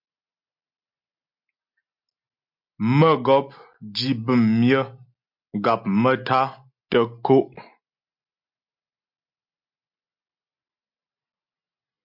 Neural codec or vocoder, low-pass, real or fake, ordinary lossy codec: none; 5.4 kHz; real; MP3, 48 kbps